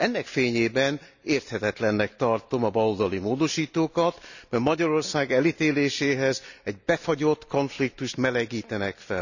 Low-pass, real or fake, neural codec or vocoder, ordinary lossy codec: 7.2 kHz; real; none; none